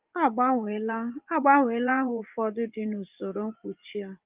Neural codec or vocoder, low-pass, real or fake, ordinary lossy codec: vocoder, 24 kHz, 100 mel bands, Vocos; 3.6 kHz; fake; Opus, 32 kbps